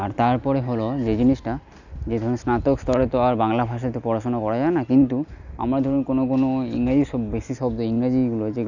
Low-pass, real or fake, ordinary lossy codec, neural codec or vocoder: 7.2 kHz; real; none; none